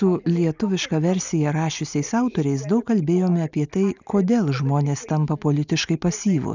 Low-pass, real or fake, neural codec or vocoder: 7.2 kHz; real; none